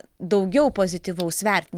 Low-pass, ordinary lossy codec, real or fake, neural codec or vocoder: 19.8 kHz; Opus, 24 kbps; real; none